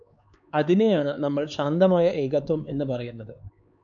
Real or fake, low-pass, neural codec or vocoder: fake; 7.2 kHz; codec, 16 kHz, 4 kbps, X-Codec, HuBERT features, trained on LibriSpeech